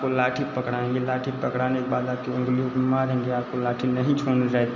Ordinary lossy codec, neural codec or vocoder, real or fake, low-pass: none; none; real; 7.2 kHz